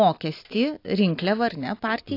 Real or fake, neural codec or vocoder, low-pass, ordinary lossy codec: real; none; 5.4 kHz; AAC, 32 kbps